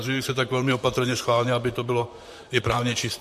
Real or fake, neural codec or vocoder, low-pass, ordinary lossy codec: fake; vocoder, 44.1 kHz, 128 mel bands, Pupu-Vocoder; 14.4 kHz; MP3, 64 kbps